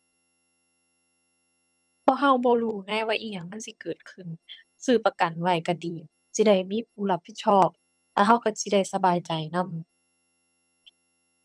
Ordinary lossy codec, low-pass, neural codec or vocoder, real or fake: none; none; vocoder, 22.05 kHz, 80 mel bands, HiFi-GAN; fake